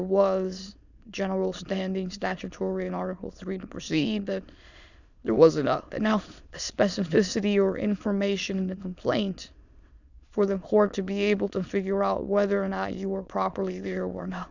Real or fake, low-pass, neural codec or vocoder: fake; 7.2 kHz; autoencoder, 22.05 kHz, a latent of 192 numbers a frame, VITS, trained on many speakers